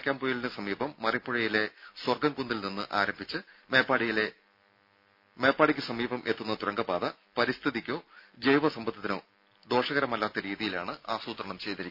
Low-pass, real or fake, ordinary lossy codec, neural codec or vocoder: 5.4 kHz; real; none; none